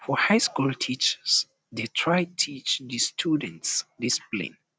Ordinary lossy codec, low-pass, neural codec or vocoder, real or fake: none; none; none; real